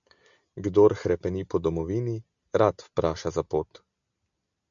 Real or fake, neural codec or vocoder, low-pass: real; none; 7.2 kHz